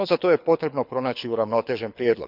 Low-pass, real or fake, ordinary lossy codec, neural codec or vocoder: 5.4 kHz; fake; none; codec, 24 kHz, 6 kbps, HILCodec